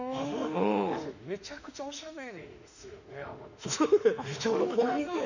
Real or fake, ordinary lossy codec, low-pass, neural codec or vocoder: fake; none; 7.2 kHz; autoencoder, 48 kHz, 32 numbers a frame, DAC-VAE, trained on Japanese speech